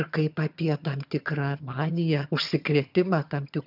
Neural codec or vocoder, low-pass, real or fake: vocoder, 22.05 kHz, 80 mel bands, HiFi-GAN; 5.4 kHz; fake